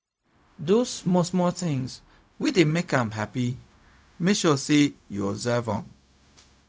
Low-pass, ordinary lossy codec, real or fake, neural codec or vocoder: none; none; fake; codec, 16 kHz, 0.4 kbps, LongCat-Audio-Codec